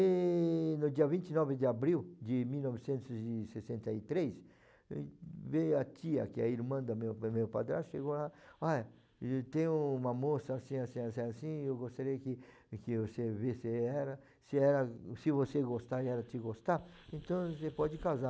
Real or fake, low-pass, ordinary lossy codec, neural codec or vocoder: real; none; none; none